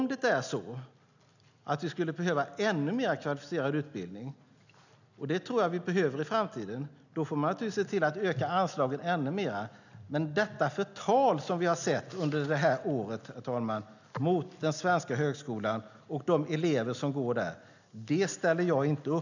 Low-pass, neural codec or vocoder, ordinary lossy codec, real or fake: 7.2 kHz; none; none; real